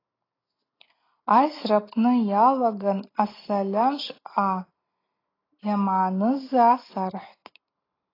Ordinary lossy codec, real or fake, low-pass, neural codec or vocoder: AAC, 24 kbps; real; 5.4 kHz; none